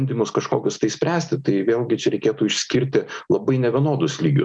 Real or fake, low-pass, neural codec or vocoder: real; 9.9 kHz; none